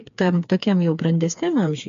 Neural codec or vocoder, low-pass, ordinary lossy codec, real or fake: codec, 16 kHz, 4 kbps, FreqCodec, smaller model; 7.2 kHz; MP3, 48 kbps; fake